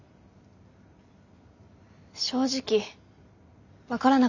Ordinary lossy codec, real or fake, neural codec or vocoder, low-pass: none; real; none; 7.2 kHz